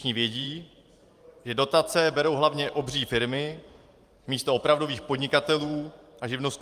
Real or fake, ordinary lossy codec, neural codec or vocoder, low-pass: fake; Opus, 32 kbps; vocoder, 44.1 kHz, 128 mel bands every 512 samples, BigVGAN v2; 14.4 kHz